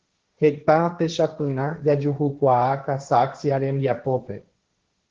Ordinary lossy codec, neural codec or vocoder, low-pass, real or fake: Opus, 16 kbps; codec, 16 kHz, 1.1 kbps, Voila-Tokenizer; 7.2 kHz; fake